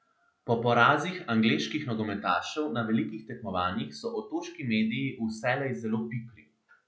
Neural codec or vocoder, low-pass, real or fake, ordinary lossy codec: none; none; real; none